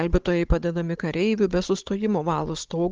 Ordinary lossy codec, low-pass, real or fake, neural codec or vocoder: Opus, 16 kbps; 7.2 kHz; fake; codec, 16 kHz, 16 kbps, FunCodec, trained on Chinese and English, 50 frames a second